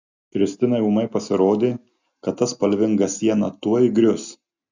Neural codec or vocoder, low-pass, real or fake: none; 7.2 kHz; real